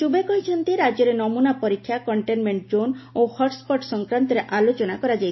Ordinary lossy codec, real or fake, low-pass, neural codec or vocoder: MP3, 24 kbps; real; 7.2 kHz; none